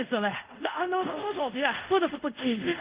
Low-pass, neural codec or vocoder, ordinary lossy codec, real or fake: 3.6 kHz; codec, 24 kHz, 0.5 kbps, DualCodec; Opus, 32 kbps; fake